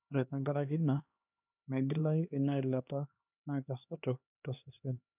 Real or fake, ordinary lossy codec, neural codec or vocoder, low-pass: fake; none; codec, 16 kHz, 2 kbps, X-Codec, HuBERT features, trained on LibriSpeech; 3.6 kHz